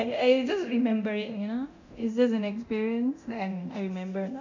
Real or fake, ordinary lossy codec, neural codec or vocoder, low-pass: fake; none; codec, 24 kHz, 0.9 kbps, DualCodec; 7.2 kHz